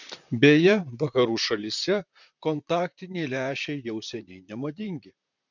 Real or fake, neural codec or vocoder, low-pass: fake; vocoder, 44.1 kHz, 128 mel bands, Pupu-Vocoder; 7.2 kHz